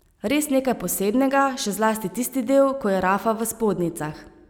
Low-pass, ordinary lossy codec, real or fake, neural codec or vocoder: none; none; real; none